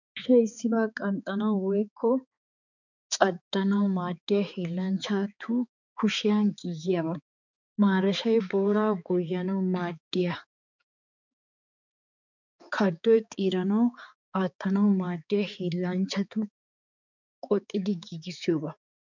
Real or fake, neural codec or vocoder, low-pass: fake; codec, 16 kHz, 4 kbps, X-Codec, HuBERT features, trained on balanced general audio; 7.2 kHz